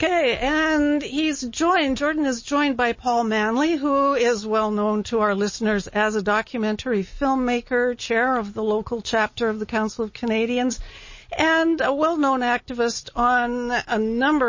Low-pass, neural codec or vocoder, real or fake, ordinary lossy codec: 7.2 kHz; none; real; MP3, 32 kbps